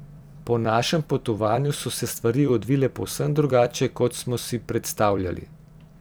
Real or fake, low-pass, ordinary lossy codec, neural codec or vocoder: fake; none; none; vocoder, 44.1 kHz, 128 mel bands every 256 samples, BigVGAN v2